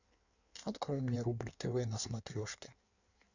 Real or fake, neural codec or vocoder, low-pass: fake; codec, 16 kHz in and 24 kHz out, 1.1 kbps, FireRedTTS-2 codec; 7.2 kHz